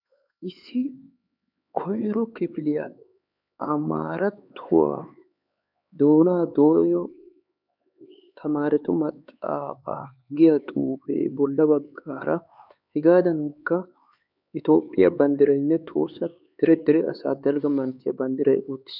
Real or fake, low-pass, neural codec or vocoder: fake; 5.4 kHz; codec, 16 kHz, 4 kbps, X-Codec, HuBERT features, trained on LibriSpeech